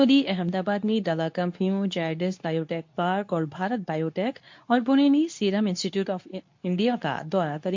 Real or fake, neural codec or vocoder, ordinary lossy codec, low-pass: fake; codec, 24 kHz, 0.9 kbps, WavTokenizer, medium speech release version 2; MP3, 48 kbps; 7.2 kHz